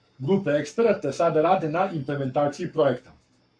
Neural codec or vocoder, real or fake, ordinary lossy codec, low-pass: codec, 44.1 kHz, 7.8 kbps, Pupu-Codec; fake; MP3, 64 kbps; 9.9 kHz